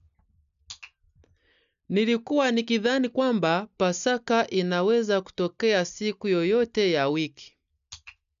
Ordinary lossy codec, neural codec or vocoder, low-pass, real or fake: none; none; 7.2 kHz; real